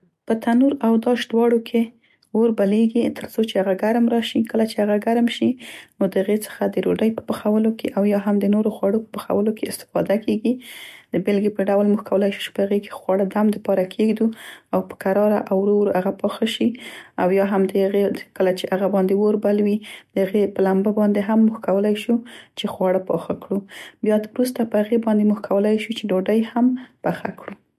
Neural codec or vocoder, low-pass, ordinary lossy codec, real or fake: none; 14.4 kHz; MP3, 64 kbps; real